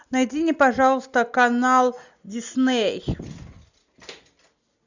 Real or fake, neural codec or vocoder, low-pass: real; none; 7.2 kHz